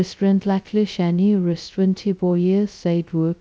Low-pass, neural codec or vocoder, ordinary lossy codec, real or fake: none; codec, 16 kHz, 0.2 kbps, FocalCodec; none; fake